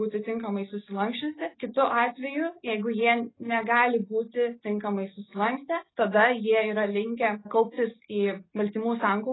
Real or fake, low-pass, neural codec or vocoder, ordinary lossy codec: real; 7.2 kHz; none; AAC, 16 kbps